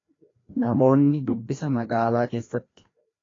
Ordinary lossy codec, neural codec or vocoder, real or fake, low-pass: AAC, 32 kbps; codec, 16 kHz, 1 kbps, FreqCodec, larger model; fake; 7.2 kHz